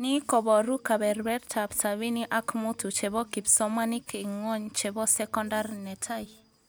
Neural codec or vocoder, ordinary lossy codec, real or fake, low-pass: none; none; real; none